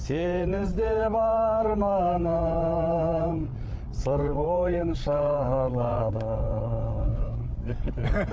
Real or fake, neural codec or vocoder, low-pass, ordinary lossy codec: fake; codec, 16 kHz, 8 kbps, FreqCodec, larger model; none; none